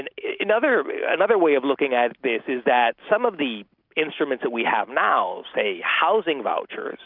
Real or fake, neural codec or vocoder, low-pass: real; none; 5.4 kHz